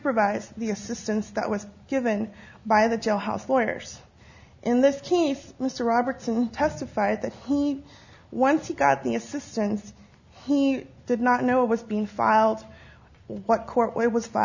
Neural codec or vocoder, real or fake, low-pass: none; real; 7.2 kHz